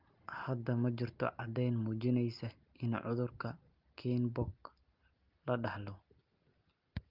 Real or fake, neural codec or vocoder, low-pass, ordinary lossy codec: real; none; 5.4 kHz; Opus, 32 kbps